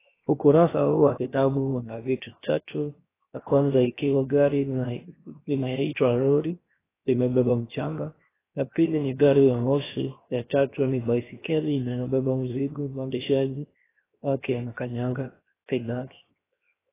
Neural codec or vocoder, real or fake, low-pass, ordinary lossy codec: codec, 16 kHz, 0.7 kbps, FocalCodec; fake; 3.6 kHz; AAC, 16 kbps